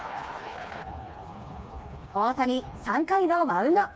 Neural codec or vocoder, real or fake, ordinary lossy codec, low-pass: codec, 16 kHz, 2 kbps, FreqCodec, smaller model; fake; none; none